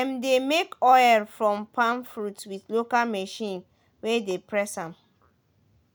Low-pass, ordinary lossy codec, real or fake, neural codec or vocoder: none; none; real; none